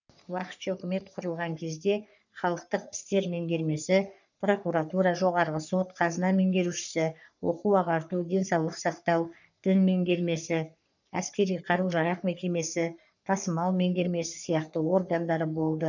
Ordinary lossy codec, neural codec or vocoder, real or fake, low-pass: none; codec, 44.1 kHz, 3.4 kbps, Pupu-Codec; fake; 7.2 kHz